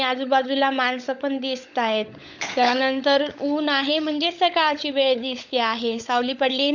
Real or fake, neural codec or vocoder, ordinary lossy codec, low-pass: fake; codec, 16 kHz, 16 kbps, FunCodec, trained on LibriTTS, 50 frames a second; none; 7.2 kHz